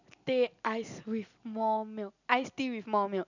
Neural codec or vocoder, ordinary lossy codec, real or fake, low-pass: none; none; real; 7.2 kHz